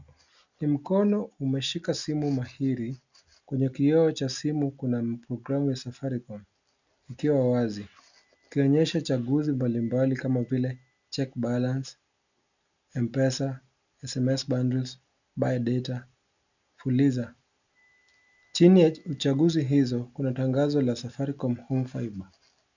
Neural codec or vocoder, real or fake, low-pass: none; real; 7.2 kHz